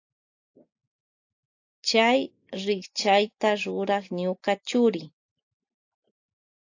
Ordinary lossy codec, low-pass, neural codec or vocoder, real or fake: AAC, 48 kbps; 7.2 kHz; none; real